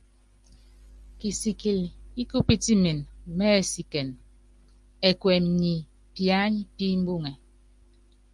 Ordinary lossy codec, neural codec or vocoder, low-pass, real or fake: Opus, 32 kbps; none; 10.8 kHz; real